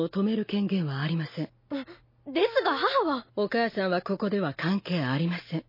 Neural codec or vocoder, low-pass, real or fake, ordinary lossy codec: none; 5.4 kHz; real; MP3, 24 kbps